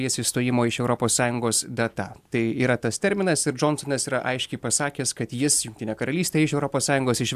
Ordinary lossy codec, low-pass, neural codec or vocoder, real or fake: AAC, 96 kbps; 14.4 kHz; none; real